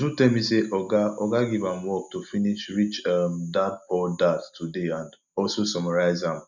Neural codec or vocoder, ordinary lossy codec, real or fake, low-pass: none; none; real; 7.2 kHz